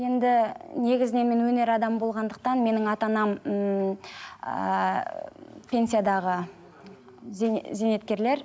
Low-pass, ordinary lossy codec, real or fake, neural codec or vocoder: none; none; real; none